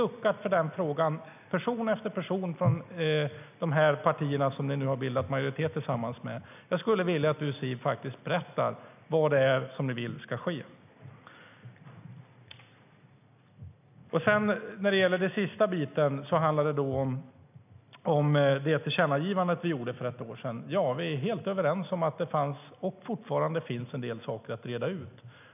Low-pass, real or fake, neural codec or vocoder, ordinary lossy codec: 3.6 kHz; real; none; none